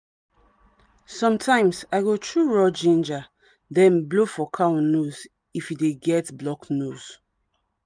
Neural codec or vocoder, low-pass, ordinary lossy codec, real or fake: none; 9.9 kHz; none; real